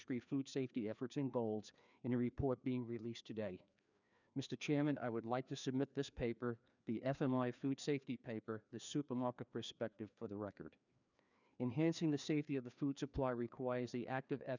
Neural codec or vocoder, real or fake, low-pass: codec, 16 kHz, 2 kbps, FreqCodec, larger model; fake; 7.2 kHz